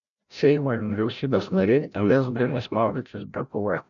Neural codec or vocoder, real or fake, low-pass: codec, 16 kHz, 0.5 kbps, FreqCodec, larger model; fake; 7.2 kHz